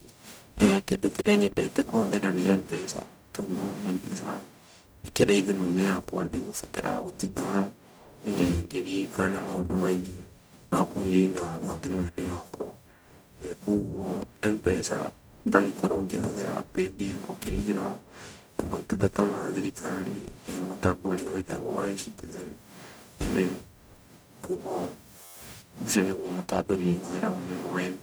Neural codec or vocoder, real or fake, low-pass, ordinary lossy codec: codec, 44.1 kHz, 0.9 kbps, DAC; fake; none; none